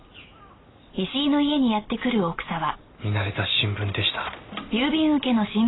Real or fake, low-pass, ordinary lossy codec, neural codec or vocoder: real; 7.2 kHz; AAC, 16 kbps; none